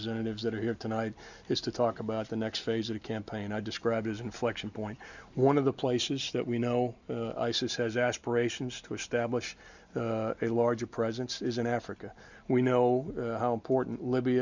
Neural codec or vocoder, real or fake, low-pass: none; real; 7.2 kHz